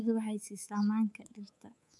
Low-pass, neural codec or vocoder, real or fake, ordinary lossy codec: none; codec, 24 kHz, 3.1 kbps, DualCodec; fake; none